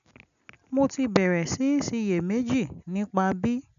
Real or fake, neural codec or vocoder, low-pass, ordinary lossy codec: real; none; 7.2 kHz; none